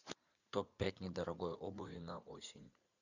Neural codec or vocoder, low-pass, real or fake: vocoder, 44.1 kHz, 80 mel bands, Vocos; 7.2 kHz; fake